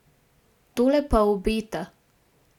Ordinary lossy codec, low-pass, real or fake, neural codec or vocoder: none; 19.8 kHz; real; none